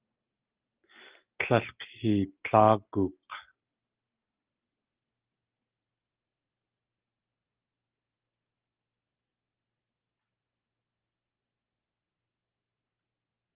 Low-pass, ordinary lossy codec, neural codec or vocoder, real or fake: 3.6 kHz; Opus, 32 kbps; none; real